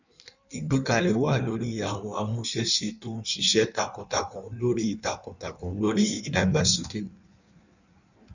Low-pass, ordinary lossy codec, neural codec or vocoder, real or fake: 7.2 kHz; none; codec, 16 kHz in and 24 kHz out, 1.1 kbps, FireRedTTS-2 codec; fake